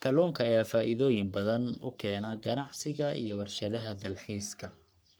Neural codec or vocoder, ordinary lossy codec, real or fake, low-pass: codec, 44.1 kHz, 3.4 kbps, Pupu-Codec; none; fake; none